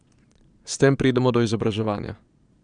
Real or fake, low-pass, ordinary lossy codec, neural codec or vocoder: fake; 9.9 kHz; none; vocoder, 22.05 kHz, 80 mel bands, Vocos